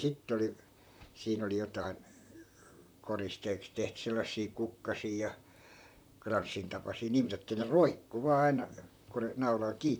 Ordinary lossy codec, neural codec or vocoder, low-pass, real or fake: none; vocoder, 44.1 kHz, 128 mel bands, Pupu-Vocoder; none; fake